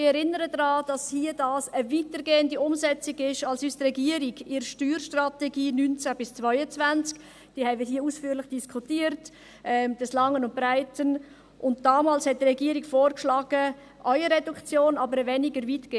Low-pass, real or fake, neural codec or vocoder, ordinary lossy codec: none; real; none; none